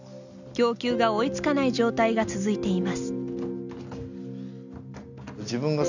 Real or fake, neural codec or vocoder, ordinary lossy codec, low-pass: real; none; none; 7.2 kHz